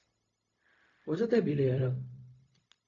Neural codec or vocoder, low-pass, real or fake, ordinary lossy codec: codec, 16 kHz, 0.4 kbps, LongCat-Audio-Codec; 7.2 kHz; fake; AAC, 32 kbps